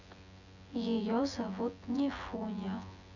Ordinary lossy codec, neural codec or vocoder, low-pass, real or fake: none; vocoder, 24 kHz, 100 mel bands, Vocos; 7.2 kHz; fake